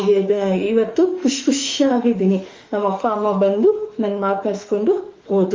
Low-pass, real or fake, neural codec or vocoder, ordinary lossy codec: 7.2 kHz; fake; autoencoder, 48 kHz, 32 numbers a frame, DAC-VAE, trained on Japanese speech; Opus, 32 kbps